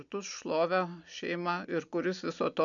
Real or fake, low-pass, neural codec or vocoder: real; 7.2 kHz; none